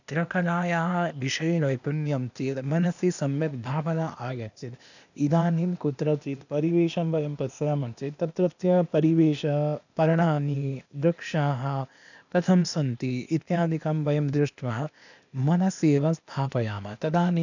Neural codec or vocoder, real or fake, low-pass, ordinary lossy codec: codec, 16 kHz, 0.8 kbps, ZipCodec; fake; 7.2 kHz; none